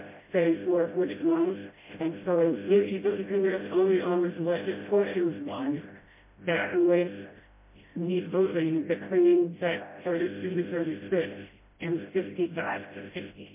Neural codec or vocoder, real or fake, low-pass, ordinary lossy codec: codec, 16 kHz, 0.5 kbps, FreqCodec, smaller model; fake; 3.6 kHz; MP3, 24 kbps